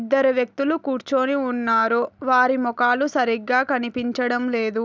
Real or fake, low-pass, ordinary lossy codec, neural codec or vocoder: real; none; none; none